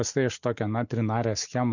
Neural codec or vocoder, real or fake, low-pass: none; real; 7.2 kHz